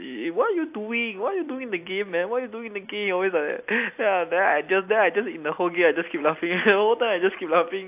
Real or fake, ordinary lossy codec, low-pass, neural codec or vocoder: real; AAC, 32 kbps; 3.6 kHz; none